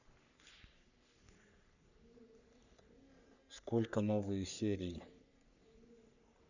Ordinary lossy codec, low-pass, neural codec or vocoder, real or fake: none; 7.2 kHz; codec, 44.1 kHz, 3.4 kbps, Pupu-Codec; fake